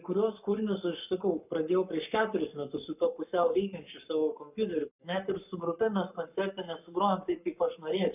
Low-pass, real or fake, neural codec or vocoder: 3.6 kHz; fake; codec, 44.1 kHz, 7.8 kbps, Pupu-Codec